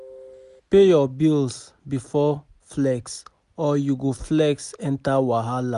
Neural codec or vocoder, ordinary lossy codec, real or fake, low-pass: none; none; real; 10.8 kHz